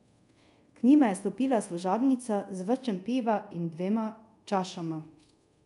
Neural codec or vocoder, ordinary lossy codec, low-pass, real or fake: codec, 24 kHz, 0.5 kbps, DualCodec; none; 10.8 kHz; fake